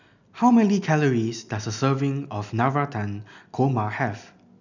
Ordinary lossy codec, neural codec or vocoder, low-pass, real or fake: none; none; 7.2 kHz; real